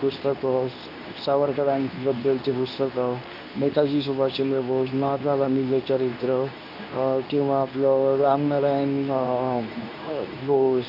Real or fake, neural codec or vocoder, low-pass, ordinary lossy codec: fake; codec, 24 kHz, 0.9 kbps, WavTokenizer, medium speech release version 2; 5.4 kHz; none